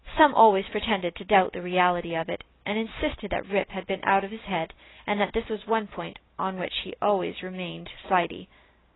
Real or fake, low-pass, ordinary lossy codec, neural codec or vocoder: real; 7.2 kHz; AAC, 16 kbps; none